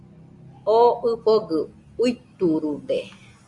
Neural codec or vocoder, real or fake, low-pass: none; real; 10.8 kHz